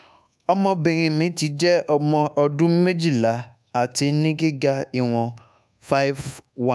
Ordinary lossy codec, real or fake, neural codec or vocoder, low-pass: none; fake; codec, 24 kHz, 1.2 kbps, DualCodec; none